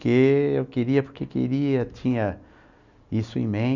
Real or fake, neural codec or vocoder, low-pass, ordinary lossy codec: real; none; 7.2 kHz; none